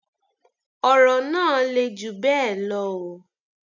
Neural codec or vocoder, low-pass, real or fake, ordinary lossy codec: none; 7.2 kHz; real; none